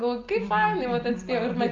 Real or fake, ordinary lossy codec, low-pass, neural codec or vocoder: real; Opus, 32 kbps; 7.2 kHz; none